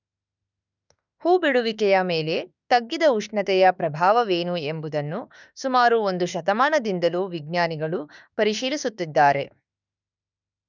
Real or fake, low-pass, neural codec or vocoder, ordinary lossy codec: fake; 7.2 kHz; autoencoder, 48 kHz, 32 numbers a frame, DAC-VAE, trained on Japanese speech; none